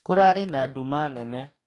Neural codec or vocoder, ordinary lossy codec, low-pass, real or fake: codec, 44.1 kHz, 2.6 kbps, DAC; AAC, 48 kbps; 10.8 kHz; fake